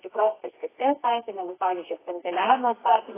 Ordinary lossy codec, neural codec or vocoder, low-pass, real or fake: AAC, 16 kbps; codec, 24 kHz, 0.9 kbps, WavTokenizer, medium music audio release; 3.6 kHz; fake